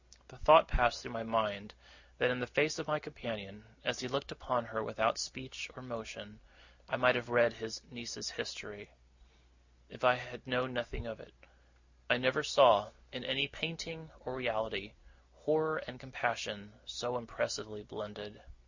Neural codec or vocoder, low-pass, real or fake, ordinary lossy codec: none; 7.2 kHz; real; Opus, 64 kbps